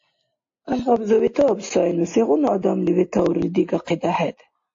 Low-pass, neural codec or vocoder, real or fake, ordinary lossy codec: 7.2 kHz; none; real; AAC, 48 kbps